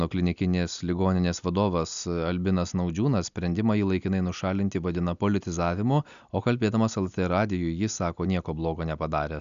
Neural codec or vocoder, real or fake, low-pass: none; real; 7.2 kHz